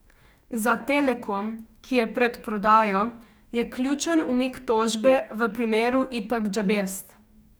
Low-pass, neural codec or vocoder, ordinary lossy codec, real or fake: none; codec, 44.1 kHz, 2.6 kbps, DAC; none; fake